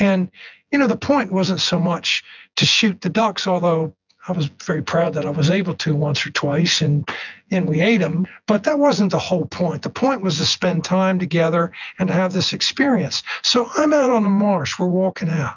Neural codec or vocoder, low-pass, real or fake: vocoder, 24 kHz, 100 mel bands, Vocos; 7.2 kHz; fake